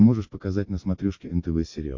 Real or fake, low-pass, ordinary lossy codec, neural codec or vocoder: real; 7.2 kHz; MP3, 48 kbps; none